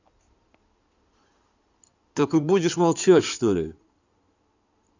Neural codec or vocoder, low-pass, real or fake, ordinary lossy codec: codec, 16 kHz in and 24 kHz out, 2.2 kbps, FireRedTTS-2 codec; 7.2 kHz; fake; none